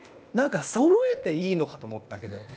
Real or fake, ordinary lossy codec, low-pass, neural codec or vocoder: fake; none; none; codec, 16 kHz, 0.8 kbps, ZipCodec